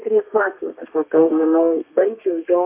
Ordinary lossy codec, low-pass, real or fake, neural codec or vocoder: MP3, 32 kbps; 3.6 kHz; fake; codec, 32 kHz, 1.9 kbps, SNAC